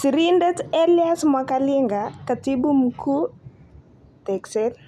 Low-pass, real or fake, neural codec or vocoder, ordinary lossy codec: 14.4 kHz; real; none; none